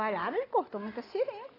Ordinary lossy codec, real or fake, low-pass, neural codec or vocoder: AAC, 24 kbps; real; 5.4 kHz; none